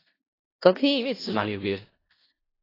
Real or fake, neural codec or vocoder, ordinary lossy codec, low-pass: fake; codec, 16 kHz in and 24 kHz out, 0.4 kbps, LongCat-Audio-Codec, four codebook decoder; AAC, 24 kbps; 5.4 kHz